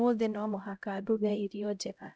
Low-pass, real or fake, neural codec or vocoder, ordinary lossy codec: none; fake; codec, 16 kHz, 0.5 kbps, X-Codec, HuBERT features, trained on LibriSpeech; none